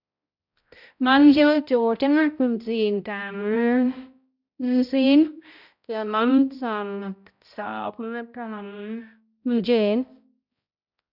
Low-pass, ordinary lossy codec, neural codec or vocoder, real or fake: 5.4 kHz; none; codec, 16 kHz, 0.5 kbps, X-Codec, HuBERT features, trained on balanced general audio; fake